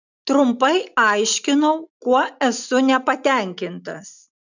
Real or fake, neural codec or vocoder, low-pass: real; none; 7.2 kHz